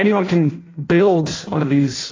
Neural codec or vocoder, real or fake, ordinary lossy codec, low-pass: codec, 16 kHz in and 24 kHz out, 0.6 kbps, FireRedTTS-2 codec; fake; AAC, 32 kbps; 7.2 kHz